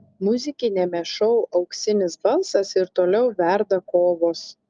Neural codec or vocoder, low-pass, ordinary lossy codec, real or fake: none; 7.2 kHz; Opus, 32 kbps; real